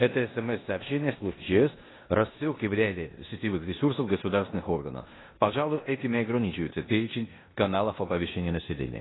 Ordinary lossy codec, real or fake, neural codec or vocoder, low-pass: AAC, 16 kbps; fake; codec, 16 kHz in and 24 kHz out, 0.9 kbps, LongCat-Audio-Codec, four codebook decoder; 7.2 kHz